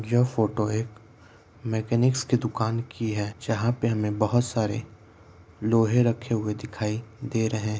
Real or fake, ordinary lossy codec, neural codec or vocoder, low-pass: real; none; none; none